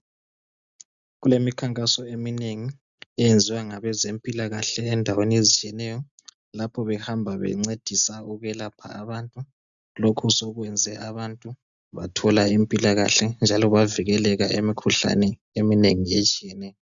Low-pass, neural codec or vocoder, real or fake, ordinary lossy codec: 7.2 kHz; none; real; MP3, 96 kbps